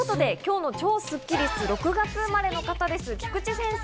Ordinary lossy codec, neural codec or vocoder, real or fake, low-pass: none; none; real; none